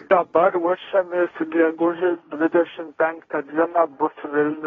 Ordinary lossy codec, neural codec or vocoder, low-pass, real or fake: AAC, 24 kbps; codec, 16 kHz, 1.1 kbps, Voila-Tokenizer; 7.2 kHz; fake